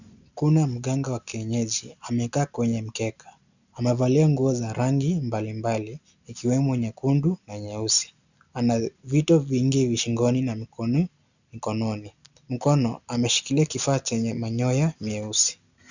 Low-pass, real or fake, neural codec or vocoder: 7.2 kHz; real; none